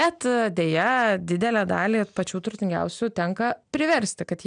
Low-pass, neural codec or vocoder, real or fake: 9.9 kHz; none; real